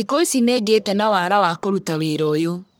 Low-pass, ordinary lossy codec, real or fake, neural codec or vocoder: none; none; fake; codec, 44.1 kHz, 1.7 kbps, Pupu-Codec